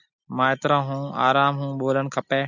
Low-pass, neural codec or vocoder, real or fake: 7.2 kHz; none; real